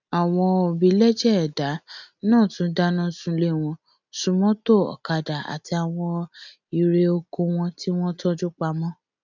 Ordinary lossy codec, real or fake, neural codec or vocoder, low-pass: none; real; none; 7.2 kHz